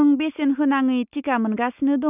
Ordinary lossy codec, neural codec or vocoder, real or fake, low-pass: none; none; real; 3.6 kHz